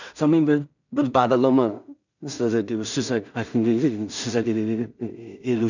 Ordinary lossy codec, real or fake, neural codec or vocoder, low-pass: none; fake; codec, 16 kHz in and 24 kHz out, 0.4 kbps, LongCat-Audio-Codec, two codebook decoder; 7.2 kHz